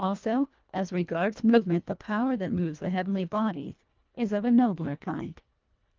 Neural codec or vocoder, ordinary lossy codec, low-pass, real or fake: codec, 24 kHz, 1.5 kbps, HILCodec; Opus, 32 kbps; 7.2 kHz; fake